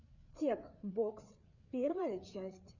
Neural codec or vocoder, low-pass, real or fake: codec, 16 kHz, 4 kbps, FreqCodec, larger model; 7.2 kHz; fake